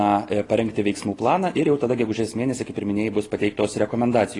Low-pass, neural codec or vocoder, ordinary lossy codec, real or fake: 10.8 kHz; none; AAC, 32 kbps; real